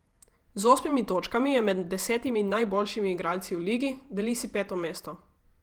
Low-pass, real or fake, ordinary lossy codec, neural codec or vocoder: 19.8 kHz; fake; Opus, 24 kbps; vocoder, 48 kHz, 128 mel bands, Vocos